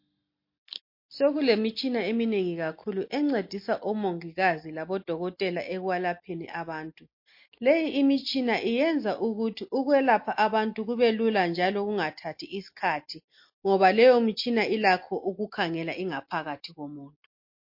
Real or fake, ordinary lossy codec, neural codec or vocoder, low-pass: real; MP3, 32 kbps; none; 5.4 kHz